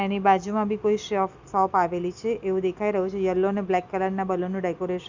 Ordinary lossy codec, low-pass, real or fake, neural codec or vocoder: Opus, 64 kbps; 7.2 kHz; real; none